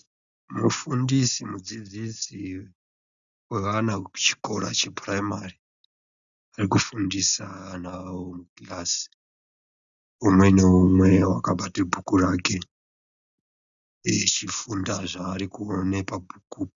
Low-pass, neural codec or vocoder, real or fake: 7.2 kHz; none; real